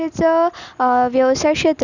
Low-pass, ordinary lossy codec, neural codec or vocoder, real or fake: 7.2 kHz; none; none; real